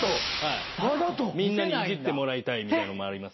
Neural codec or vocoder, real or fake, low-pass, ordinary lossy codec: none; real; 7.2 kHz; MP3, 24 kbps